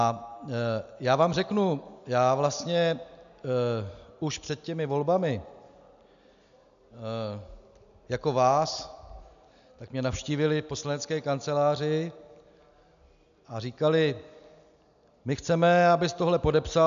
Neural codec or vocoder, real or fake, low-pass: none; real; 7.2 kHz